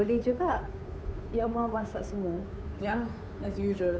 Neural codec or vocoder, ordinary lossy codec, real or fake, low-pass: codec, 16 kHz, 2 kbps, FunCodec, trained on Chinese and English, 25 frames a second; none; fake; none